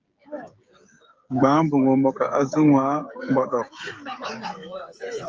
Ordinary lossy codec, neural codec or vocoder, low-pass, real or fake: Opus, 24 kbps; codec, 16 kHz, 16 kbps, FreqCodec, smaller model; 7.2 kHz; fake